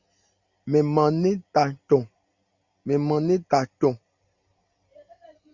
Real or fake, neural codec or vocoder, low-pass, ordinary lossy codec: real; none; 7.2 kHz; Opus, 64 kbps